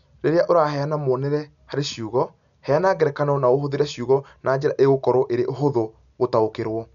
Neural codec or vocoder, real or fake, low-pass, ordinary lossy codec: none; real; 7.2 kHz; none